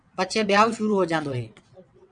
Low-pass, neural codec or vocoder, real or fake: 9.9 kHz; vocoder, 22.05 kHz, 80 mel bands, WaveNeXt; fake